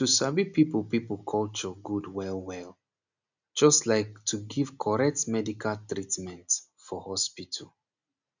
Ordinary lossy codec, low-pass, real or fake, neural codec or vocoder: none; 7.2 kHz; real; none